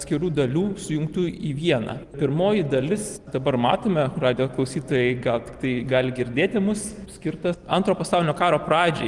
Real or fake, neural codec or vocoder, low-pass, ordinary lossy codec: real; none; 10.8 kHz; Opus, 24 kbps